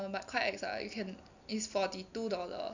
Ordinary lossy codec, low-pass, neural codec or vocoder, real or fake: none; 7.2 kHz; none; real